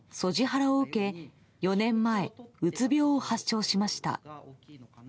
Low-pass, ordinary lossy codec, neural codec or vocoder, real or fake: none; none; none; real